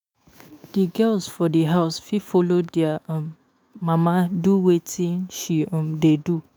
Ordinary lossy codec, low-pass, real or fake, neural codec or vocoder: none; none; fake; autoencoder, 48 kHz, 128 numbers a frame, DAC-VAE, trained on Japanese speech